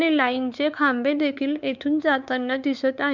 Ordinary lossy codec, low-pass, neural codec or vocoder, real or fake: none; 7.2 kHz; codec, 16 kHz, 4 kbps, X-Codec, WavLM features, trained on Multilingual LibriSpeech; fake